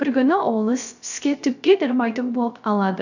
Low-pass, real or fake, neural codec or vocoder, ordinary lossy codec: 7.2 kHz; fake; codec, 16 kHz, 0.3 kbps, FocalCodec; none